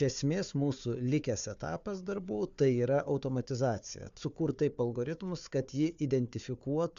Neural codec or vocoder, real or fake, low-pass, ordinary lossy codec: codec, 16 kHz, 4 kbps, FunCodec, trained on Chinese and English, 50 frames a second; fake; 7.2 kHz; MP3, 64 kbps